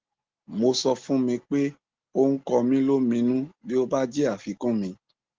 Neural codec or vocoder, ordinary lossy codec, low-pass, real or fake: none; Opus, 16 kbps; 7.2 kHz; real